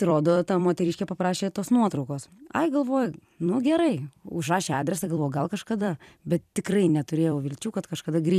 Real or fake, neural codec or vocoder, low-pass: fake; vocoder, 44.1 kHz, 128 mel bands every 256 samples, BigVGAN v2; 14.4 kHz